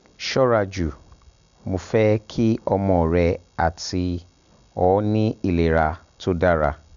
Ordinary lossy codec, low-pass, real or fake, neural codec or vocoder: none; 7.2 kHz; real; none